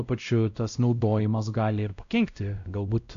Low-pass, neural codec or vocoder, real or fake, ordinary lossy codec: 7.2 kHz; codec, 16 kHz, 0.5 kbps, X-Codec, WavLM features, trained on Multilingual LibriSpeech; fake; AAC, 96 kbps